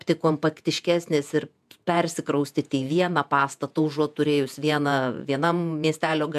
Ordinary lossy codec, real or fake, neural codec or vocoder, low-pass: MP3, 96 kbps; fake; vocoder, 48 kHz, 128 mel bands, Vocos; 14.4 kHz